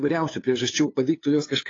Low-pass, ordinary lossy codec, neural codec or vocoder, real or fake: 7.2 kHz; AAC, 32 kbps; codec, 16 kHz, 2 kbps, FunCodec, trained on LibriTTS, 25 frames a second; fake